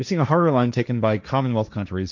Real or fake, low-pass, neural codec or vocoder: fake; 7.2 kHz; codec, 16 kHz, 1.1 kbps, Voila-Tokenizer